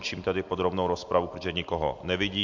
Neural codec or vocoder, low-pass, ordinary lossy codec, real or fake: none; 7.2 kHz; MP3, 64 kbps; real